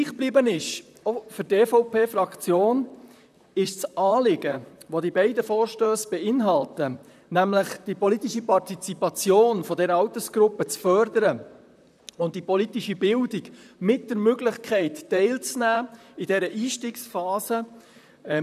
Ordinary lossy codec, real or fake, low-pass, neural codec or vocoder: none; fake; 14.4 kHz; vocoder, 44.1 kHz, 128 mel bands, Pupu-Vocoder